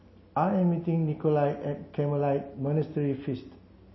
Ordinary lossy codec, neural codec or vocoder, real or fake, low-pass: MP3, 24 kbps; none; real; 7.2 kHz